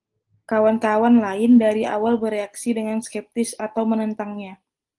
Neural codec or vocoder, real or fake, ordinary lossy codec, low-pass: none; real; Opus, 24 kbps; 10.8 kHz